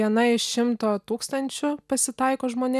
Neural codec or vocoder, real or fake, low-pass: none; real; 14.4 kHz